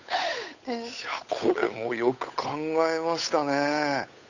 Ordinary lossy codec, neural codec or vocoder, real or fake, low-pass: none; codec, 16 kHz, 8 kbps, FunCodec, trained on Chinese and English, 25 frames a second; fake; 7.2 kHz